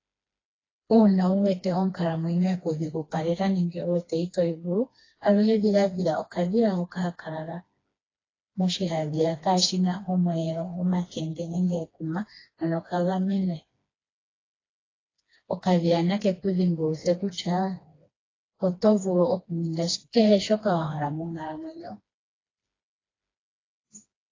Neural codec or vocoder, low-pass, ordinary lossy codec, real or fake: codec, 16 kHz, 2 kbps, FreqCodec, smaller model; 7.2 kHz; AAC, 32 kbps; fake